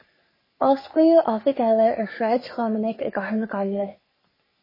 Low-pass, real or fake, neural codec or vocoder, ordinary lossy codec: 5.4 kHz; fake; codec, 44.1 kHz, 3.4 kbps, Pupu-Codec; MP3, 24 kbps